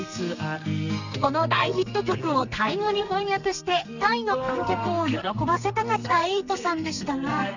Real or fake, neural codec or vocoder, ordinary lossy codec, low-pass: fake; codec, 32 kHz, 1.9 kbps, SNAC; none; 7.2 kHz